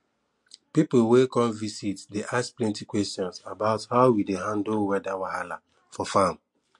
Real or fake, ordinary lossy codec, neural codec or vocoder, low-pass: real; MP3, 48 kbps; none; 10.8 kHz